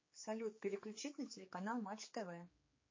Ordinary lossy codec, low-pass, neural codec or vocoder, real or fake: MP3, 32 kbps; 7.2 kHz; codec, 16 kHz, 4 kbps, X-Codec, HuBERT features, trained on general audio; fake